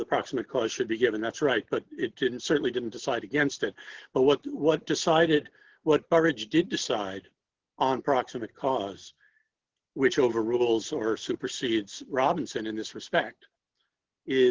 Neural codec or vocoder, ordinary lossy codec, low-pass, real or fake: none; Opus, 16 kbps; 7.2 kHz; real